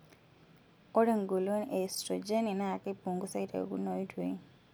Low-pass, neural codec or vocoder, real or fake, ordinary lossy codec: none; none; real; none